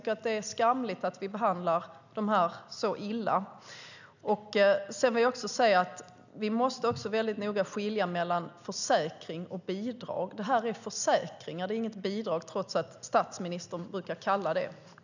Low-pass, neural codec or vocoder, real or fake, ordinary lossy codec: 7.2 kHz; none; real; none